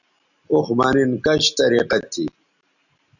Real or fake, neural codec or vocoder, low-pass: real; none; 7.2 kHz